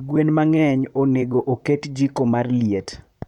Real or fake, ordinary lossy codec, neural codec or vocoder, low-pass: fake; none; vocoder, 44.1 kHz, 128 mel bands, Pupu-Vocoder; 19.8 kHz